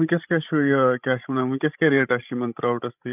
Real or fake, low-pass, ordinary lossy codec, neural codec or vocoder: fake; 3.6 kHz; none; codec, 16 kHz, 16 kbps, FreqCodec, larger model